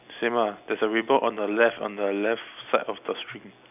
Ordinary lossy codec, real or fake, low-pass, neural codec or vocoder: none; real; 3.6 kHz; none